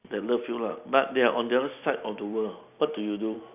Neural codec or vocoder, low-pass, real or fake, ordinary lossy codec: none; 3.6 kHz; real; none